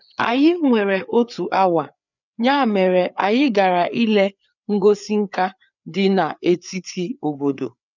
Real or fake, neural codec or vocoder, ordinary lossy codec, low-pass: fake; codec, 16 kHz, 4 kbps, FreqCodec, larger model; none; 7.2 kHz